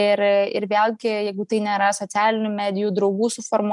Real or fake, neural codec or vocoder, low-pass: real; none; 10.8 kHz